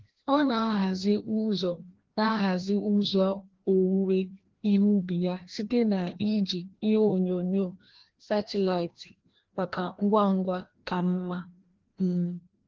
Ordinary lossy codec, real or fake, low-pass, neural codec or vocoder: Opus, 16 kbps; fake; 7.2 kHz; codec, 16 kHz, 1 kbps, FreqCodec, larger model